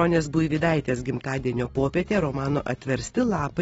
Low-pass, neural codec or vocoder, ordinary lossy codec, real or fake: 19.8 kHz; none; AAC, 24 kbps; real